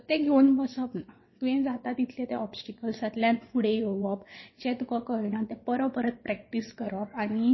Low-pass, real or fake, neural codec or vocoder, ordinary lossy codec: 7.2 kHz; fake; vocoder, 22.05 kHz, 80 mel bands, WaveNeXt; MP3, 24 kbps